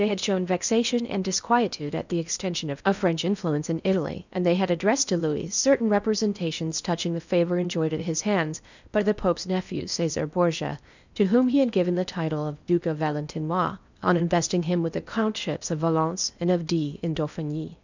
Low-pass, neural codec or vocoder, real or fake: 7.2 kHz; codec, 16 kHz in and 24 kHz out, 0.8 kbps, FocalCodec, streaming, 65536 codes; fake